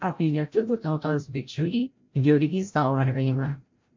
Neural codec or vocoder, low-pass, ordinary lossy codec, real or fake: codec, 16 kHz, 0.5 kbps, FreqCodec, larger model; 7.2 kHz; MP3, 48 kbps; fake